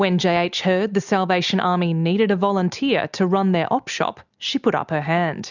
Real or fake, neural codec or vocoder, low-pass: real; none; 7.2 kHz